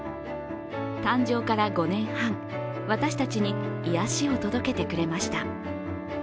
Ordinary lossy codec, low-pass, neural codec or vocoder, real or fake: none; none; none; real